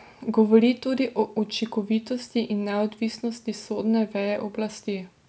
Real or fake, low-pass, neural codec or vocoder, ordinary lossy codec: real; none; none; none